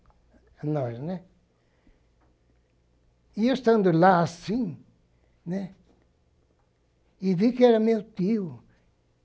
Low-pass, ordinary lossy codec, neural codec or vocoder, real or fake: none; none; none; real